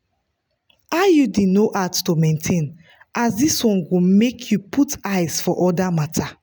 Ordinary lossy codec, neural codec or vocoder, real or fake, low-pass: none; none; real; none